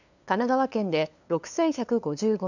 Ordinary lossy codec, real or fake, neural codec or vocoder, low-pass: none; fake; codec, 16 kHz, 2 kbps, FunCodec, trained on LibriTTS, 25 frames a second; 7.2 kHz